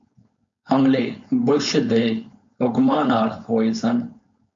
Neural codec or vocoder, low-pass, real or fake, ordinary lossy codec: codec, 16 kHz, 4.8 kbps, FACodec; 7.2 kHz; fake; MP3, 48 kbps